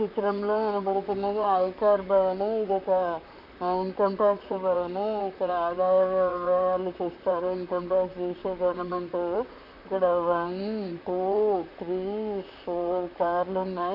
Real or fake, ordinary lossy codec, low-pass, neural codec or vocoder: fake; none; 5.4 kHz; vocoder, 44.1 kHz, 128 mel bands, Pupu-Vocoder